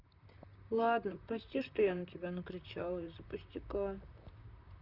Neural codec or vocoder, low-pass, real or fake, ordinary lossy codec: vocoder, 44.1 kHz, 128 mel bands, Pupu-Vocoder; 5.4 kHz; fake; none